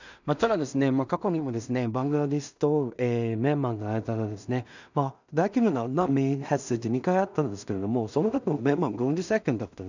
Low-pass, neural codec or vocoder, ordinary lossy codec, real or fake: 7.2 kHz; codec, 16 kHz in and 24 kHz out, 0.4 kbps, LongCat-Audio-Codec, two codebook decoder; none; fake